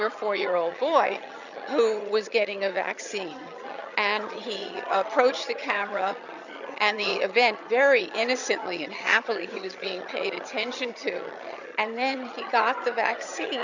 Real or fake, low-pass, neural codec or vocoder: fake; 7.2 kHz; vocoder, 22.05 kHz, 80 mel bands, HiFi-GAN